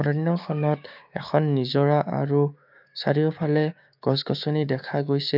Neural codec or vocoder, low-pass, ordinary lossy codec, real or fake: codec, 16 kHz, 6 kbps, DAC; 5.4 kHz; none; fake